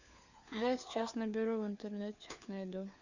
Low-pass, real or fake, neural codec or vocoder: 7.2 kHz; fake; codec, 16 kHz, 4 kbps, FunCodec, trained on LibriTTS, 50 frames a second